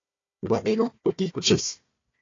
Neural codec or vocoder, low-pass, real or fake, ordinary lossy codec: codec, 16 kHz, 1 kbps, FunCodec, trained on Chinese and English, 50 frames a second; 7.2 kHz; fake; AAC, 32 kbps